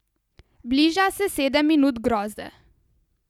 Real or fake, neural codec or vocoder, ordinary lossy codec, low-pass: real; none; none; 19.8 kHz